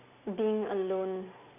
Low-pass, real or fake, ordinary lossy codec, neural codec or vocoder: 3.6 kHz; real; none; none